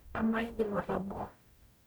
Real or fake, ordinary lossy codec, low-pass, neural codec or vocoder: fake; none; none; codec, 44.1 kHz, 0.9 kbps, DAC